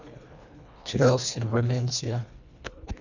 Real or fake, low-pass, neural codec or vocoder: fake; 7.2 kHz; codec, 24 kHz, 1.5 kbps, HILCodec